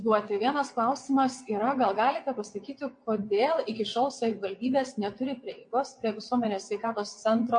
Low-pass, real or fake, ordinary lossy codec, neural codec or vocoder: 9.9 kHz; fake; MP3, 48 kbps; vocoder, 22.05 kHz, 80 mel bands, WaveNeXt